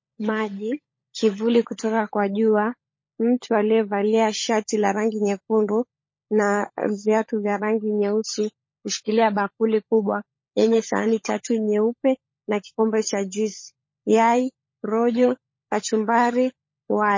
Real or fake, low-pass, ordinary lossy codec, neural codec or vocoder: fake; 7.2 kHz; MP3, 32 kbps; codec, 16 kHz, 16 kbps, FunCodec, trained on LibriTTS, 50 frames a second